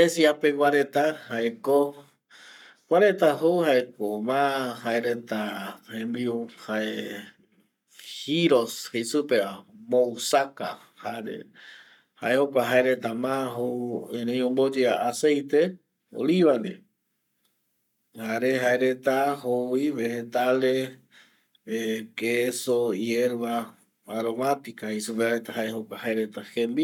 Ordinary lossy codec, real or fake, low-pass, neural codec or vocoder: none; fake; 19.8 kHz; codec, 44.1 kHz, 7.8 kbps, Pupu-Codec